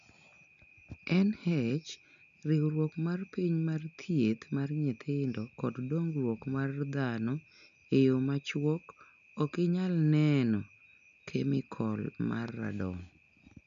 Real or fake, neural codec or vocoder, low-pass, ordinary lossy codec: real; none; 7.2 kHz; MP3, 96 kbps